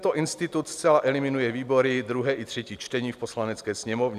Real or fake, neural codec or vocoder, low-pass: real; none; 14.4 kHz